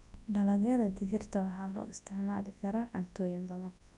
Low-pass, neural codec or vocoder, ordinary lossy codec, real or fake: 10.8 kHz; codec, 24 kHz, 0.9 kbps, WavTokenizer, large speech release; none; fake